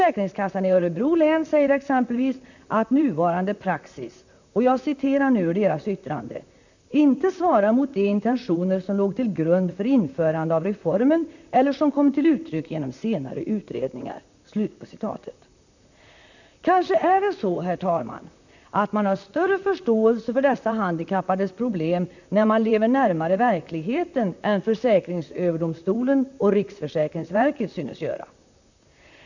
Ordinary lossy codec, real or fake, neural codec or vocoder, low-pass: none; fake; vocoder, 44.1 kHz, 128 mel bands, Pupu-Vocoder; 7.2 kHz